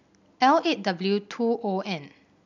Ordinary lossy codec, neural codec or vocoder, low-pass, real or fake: none; none; 7.2 kHz; real